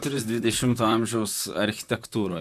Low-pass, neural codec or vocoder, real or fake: 14.4 kHz; vocoder, 44.1 kHz, 128 mel bands, Pupu-Vocoder; fake